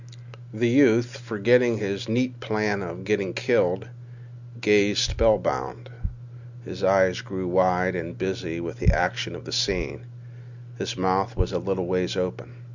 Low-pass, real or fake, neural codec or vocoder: 7.2 kHz; real; none